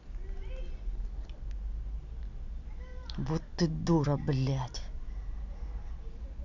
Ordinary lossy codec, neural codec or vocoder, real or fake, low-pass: none; none; real; 7.2 kHz